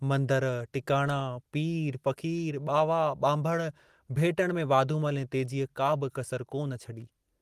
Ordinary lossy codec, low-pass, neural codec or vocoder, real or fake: Opus, 24 kbps; 14.4 kHz; none; real